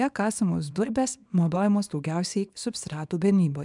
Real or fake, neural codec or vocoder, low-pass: fake; codec, 24 kHz, 0.9 kbps, WavTokenizer, small release; 10.8 kHz